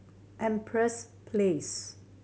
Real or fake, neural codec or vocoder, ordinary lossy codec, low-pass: real; none; none; none